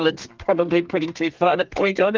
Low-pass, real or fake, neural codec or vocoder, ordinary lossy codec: 7.2 kHz; fake; codec, 32 kHz, 1.9 kbps, SNAC; Opus, 32 kbps